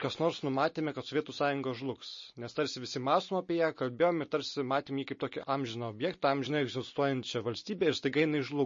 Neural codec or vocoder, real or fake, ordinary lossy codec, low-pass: none; real; MP3, 32 kbps; 7.2 kHz